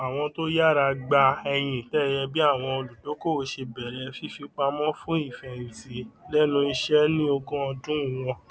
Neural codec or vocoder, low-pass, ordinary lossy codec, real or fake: none; none; none; real